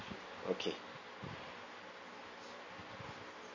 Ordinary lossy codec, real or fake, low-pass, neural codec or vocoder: MP3, 32 kbps; real; 7.2 kHz; none